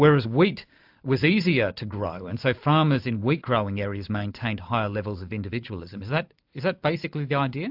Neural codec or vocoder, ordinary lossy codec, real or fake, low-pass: none; AAC, 48 kbps; real; 5.4 kHz